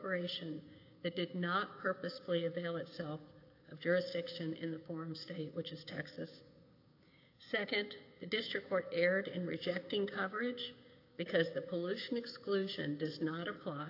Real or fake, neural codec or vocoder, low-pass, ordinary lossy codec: fake; codec, 16 kHz, 6 kbps, DAC; 5.4 kHz; AAC, 32 kbps